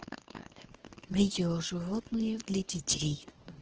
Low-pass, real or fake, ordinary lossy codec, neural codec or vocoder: 7.2 kHz; fake; Opus, 16 kbps; codec, 24 kHz, 0.9 kbps, WavTokenizer, small release